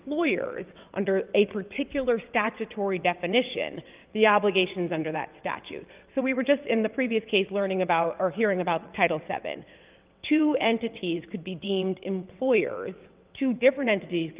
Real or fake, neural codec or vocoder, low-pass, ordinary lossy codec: fake; vocoder, 22.05 kHz, 80 mel bands, WaveNeXt; 3.6 kHz; Opus, 32 kbps